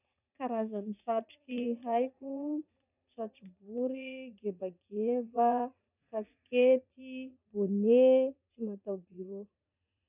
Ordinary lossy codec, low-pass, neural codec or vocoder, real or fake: none; 3.6 kHz; vocoder, 44.1 kHz, 80 mel bands, Vocos; fake